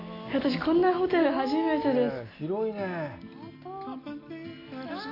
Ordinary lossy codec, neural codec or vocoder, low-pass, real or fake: AAC, 32 kbps; none; 5.4 kHz; real